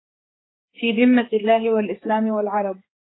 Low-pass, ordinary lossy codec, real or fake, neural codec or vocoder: 7.2 kHz; AAC, 16 kbps; fake; codec, 16 kHz, 4 kbps, X-Codec, HuBERT features, trained on general audio